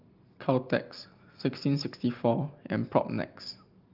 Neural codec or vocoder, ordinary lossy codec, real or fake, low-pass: none; Opus, 32 kbps; real; 5.4 kHz